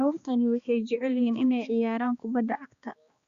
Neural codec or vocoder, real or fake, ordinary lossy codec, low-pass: codec, 16 kHz, 2 kbps, X-Codec, HuBERT features, trained on balanced general audio; fake; Opus, 64 kbps; 7.2 kHz